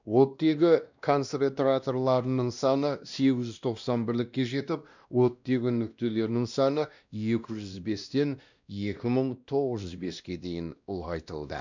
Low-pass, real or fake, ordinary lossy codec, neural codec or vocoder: 7.2 kHz; fake; none; codec, 16 kHz, 1 kbps, X-Codec, WavLM features, trained on Multilingual LibriSpeech